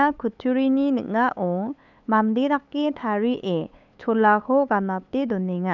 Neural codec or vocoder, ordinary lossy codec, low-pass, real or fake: codec, 16 kHz, 8 kbps, FunCodec, trained on LibriTTS, 25 frames a second; none; 7.2 kHz; fake